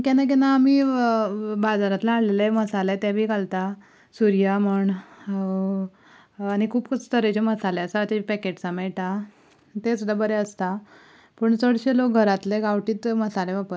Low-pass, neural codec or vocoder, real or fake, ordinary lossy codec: none; none; real; none